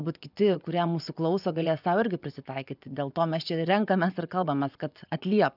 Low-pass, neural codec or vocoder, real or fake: 5.4 kHz; vocoder, 24 kHz, 100 mel bands, Vocos; fake